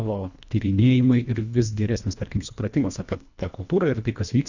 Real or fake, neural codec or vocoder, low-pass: fake; codec, 24 kHz, 1.5 kbps, HILCodec; 7.2 kHz